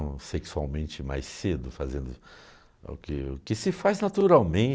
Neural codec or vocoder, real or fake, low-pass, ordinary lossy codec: none; real; none; none